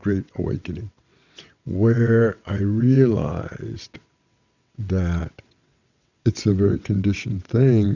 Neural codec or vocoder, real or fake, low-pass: vocoder, 22.05 kHz, 80 mel bands, WaveNeXt; fake; 7.2 kHz